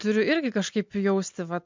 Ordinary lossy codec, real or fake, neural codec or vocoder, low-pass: MP3, 64 kbps; real; none; 7.2 kHz